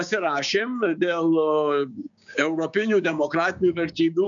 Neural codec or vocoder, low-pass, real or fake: codec, 16 kHz, 6 kbps, DAC; 7.2 kHz; fake